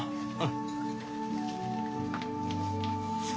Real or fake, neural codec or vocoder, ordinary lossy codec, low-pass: real; none; none; none